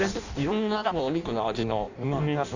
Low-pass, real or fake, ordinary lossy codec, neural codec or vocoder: 7.2 kHz; fake; none; codec, 16 kHz in and 24 kHz out, 0.6 kbps, FireRedTTS-2 codec